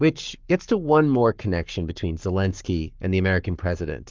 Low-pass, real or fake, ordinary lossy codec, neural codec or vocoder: 7.2 kHz; fake; Opus, 32 kbps; codec, 44.1 kHz, 7.8 kbps, Pupu-Codec